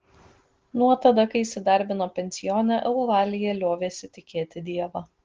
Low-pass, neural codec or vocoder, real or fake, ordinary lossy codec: 7.2 kHz; none; real; Opus, 16 kbps